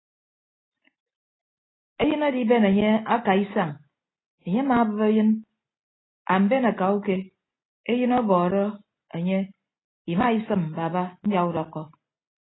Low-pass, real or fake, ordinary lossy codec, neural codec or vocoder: 7.2 kHz; real; AAC, 16 kbps; none